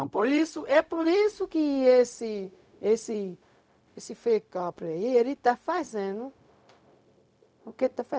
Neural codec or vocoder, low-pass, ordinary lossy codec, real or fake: codec, 16 kHz, 0.4 kbps, LongCat-Audio-Codec; none; none; fake